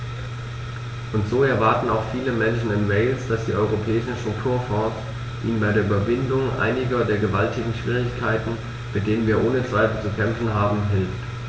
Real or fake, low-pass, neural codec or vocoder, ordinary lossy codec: real; none; none; none